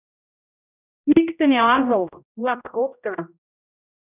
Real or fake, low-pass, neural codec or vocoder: fake; 3.6 kHz; codec, 16 kHz, 0.5 kbps, X-Codec, HuBERT features, trained on general audio